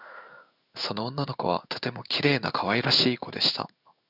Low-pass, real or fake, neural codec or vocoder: 5.4 kHz; fake; codec, 16 kHz in and 24 kHz out, 1 kbps, XY-Tokenizer